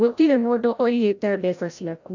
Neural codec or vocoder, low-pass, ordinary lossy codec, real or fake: codec, 16 kHz, 0.5 kbps, FreqCodec, larger model; 7.2 kHz; none; fake